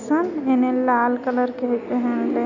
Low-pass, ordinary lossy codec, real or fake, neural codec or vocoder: 7.2 kHz; none; real; none